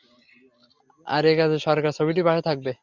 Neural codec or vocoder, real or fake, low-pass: none; real; 7.2 kHz